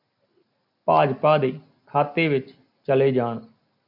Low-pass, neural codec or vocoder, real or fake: 5.4 kHz; none; real